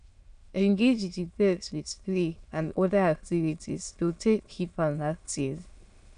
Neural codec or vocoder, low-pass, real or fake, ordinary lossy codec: autoencoder, 22.05 kHz, a latent of 192 numbers a frame, VITS, trained on many speakers; 9.9 kHz; fake; none